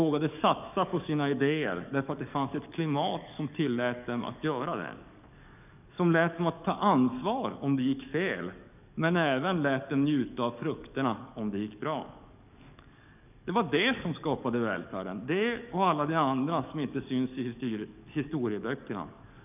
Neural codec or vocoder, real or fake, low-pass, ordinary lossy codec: codec, 44.1 kHz, 7.8 kbps, DAC; fake; 3.6 kHz; none